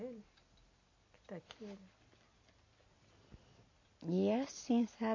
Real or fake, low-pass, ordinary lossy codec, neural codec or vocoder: real; 7.2 kHz; MP3, 32 kbps; none